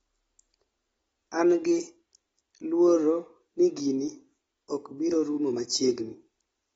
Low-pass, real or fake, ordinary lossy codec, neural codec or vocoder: 14.4 kHz; real; AAC, 24 kbps; none